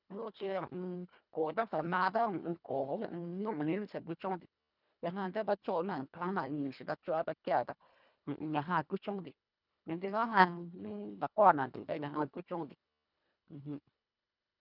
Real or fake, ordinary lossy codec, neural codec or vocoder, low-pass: fake; none; codec, 24 kHz, 1.5 kbps, HILCodec; 5.4 kHz